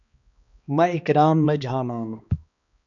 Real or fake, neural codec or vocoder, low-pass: fake; codec, 16 kHz, 2 kbps, X-Codec, HuBERT features, trained on balanced general audio; 7.2 kHz